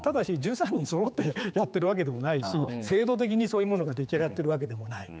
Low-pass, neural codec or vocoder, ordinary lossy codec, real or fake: none; codec, 16 kHz, 4 kbps, X-Codec, HuBERT features, trained on general audio; none; fake